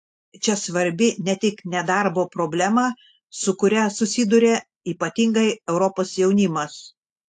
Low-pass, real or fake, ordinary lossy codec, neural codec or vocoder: 10.8 kHz; real; AAC, 64 kbps; none